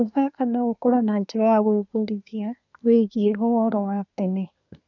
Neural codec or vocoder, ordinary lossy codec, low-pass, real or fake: codec, 24 kHz, 1 kbps, SNAC; none; 7.2 kHz; fake